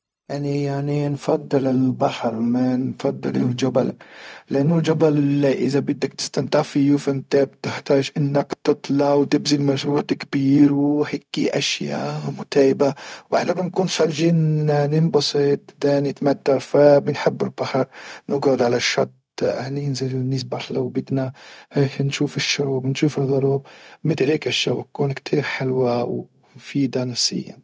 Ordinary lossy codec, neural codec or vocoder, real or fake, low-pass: none; codec, 16 kHz, 0.4 kbps, LongCat-Audio-Codec; fake; none